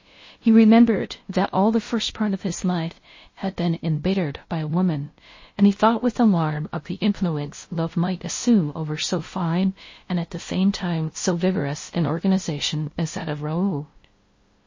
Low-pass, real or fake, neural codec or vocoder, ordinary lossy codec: 7.2 kHz; fake; codec, 16 kHz, 0.5 kbps, FunCodec, trained on LibriTTS, 25 frames a second; MP3, 32 kbps